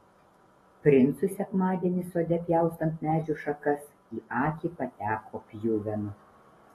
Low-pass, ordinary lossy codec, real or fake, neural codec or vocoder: 19.8 kHz; AAC, 32 kbps; real; none